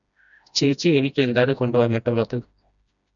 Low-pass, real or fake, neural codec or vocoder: 7.2 kHz; fake; codec, 16 kHz, 1 kbps, FreqCodec, smaller model